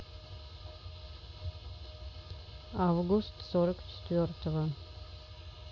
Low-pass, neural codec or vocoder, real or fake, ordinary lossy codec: none; none; real; none